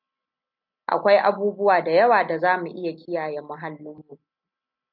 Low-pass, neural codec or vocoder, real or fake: 5.4 kHz; none; real